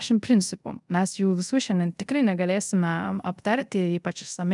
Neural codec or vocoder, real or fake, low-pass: codec, 24 kHz, 0.5 kbps, DualCodec; fake; 10.8 kHz